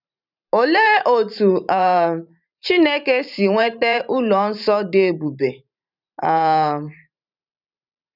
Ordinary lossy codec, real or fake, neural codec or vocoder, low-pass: none; fake; vocoder, 44.1 kHz, 128 mel bands every 512 samples, BigVGAN v2; 5.4 kHz